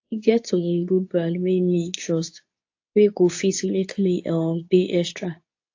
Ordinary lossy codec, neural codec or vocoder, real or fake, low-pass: AAC, 48 kbps; codec, 24 kHz, 0.9 kbps, WavTokenizer, medium speech release version 2; fake; 7.2 kHz